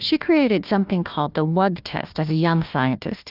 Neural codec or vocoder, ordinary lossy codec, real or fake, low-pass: codec, 16 kHz, 1 kbps, FunCodec, trained on Chinese and English, 50 frames a second; Opus, 32 kbps; fake; 5.4 kHz